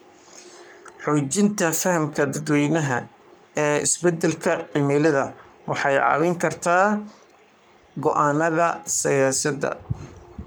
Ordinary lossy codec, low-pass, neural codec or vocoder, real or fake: none; none; codec, 44.1 kHz, 3.4 kbps, Pupu-Codec; fake